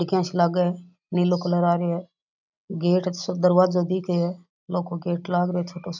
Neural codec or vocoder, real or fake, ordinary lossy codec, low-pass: none; real; none; 7.2 kHz